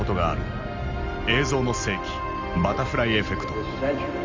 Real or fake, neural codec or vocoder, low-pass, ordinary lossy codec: real; none; 7.2 kHz; Opus, 32 kbps